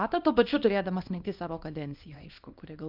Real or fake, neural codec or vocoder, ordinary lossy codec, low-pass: fake; codec, 24 kHz, 0.9 kbps, WavTokenizer, small release; Opus, 24 kbps; 5.4 kHz